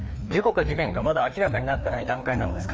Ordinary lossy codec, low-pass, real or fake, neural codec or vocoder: none; none; fake; codec, 16 kHz, 2 kbps, FreqCodec, larger model